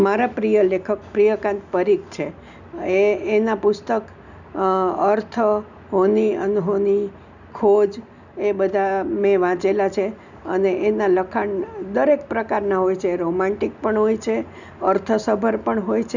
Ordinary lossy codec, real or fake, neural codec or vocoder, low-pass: none; real; none; 7.2 kHz